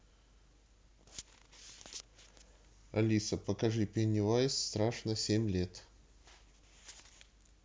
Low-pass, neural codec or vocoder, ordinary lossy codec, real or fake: none; none; none; real